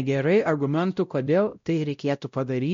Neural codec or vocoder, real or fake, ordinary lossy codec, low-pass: codec, 16 kHz, 0.5 kbps, X-Codec, WavLM features, trained on Multilingual LibriSpeech; fake; MP3, 48 kbps; 7.2 kHz